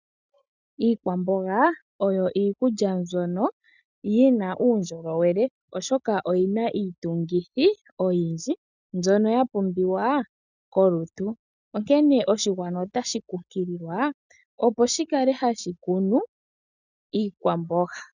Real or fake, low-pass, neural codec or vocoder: real; 7.2 kHz; none